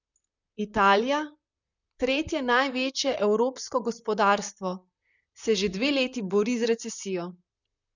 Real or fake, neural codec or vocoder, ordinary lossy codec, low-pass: fake; vocoder, 44.1 kHz, 128 mel bands, Pupu-Vocoder; none; 7.2 kHz